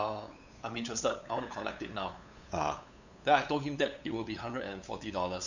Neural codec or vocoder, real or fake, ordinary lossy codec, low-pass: codec, 16 kHz, 8 kbps, FunCodec, trained on LibriTTS, 25 frames a second; fake; none; 7.2 kHz